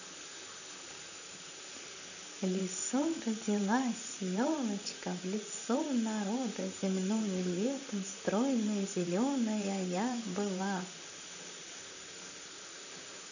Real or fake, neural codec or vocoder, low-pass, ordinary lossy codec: fake; vocoder, 44.1 kHz, 128 mel bands, Pupu-Vocoder; 7.2 kHz; none